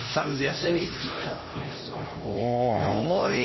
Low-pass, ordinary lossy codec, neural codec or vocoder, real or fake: 7.2 kHz; MP3, 24 kbps; codec, 16 kHz, 1 kbps, X-Codec, HuBERT features, trained on LibriSpeech; fake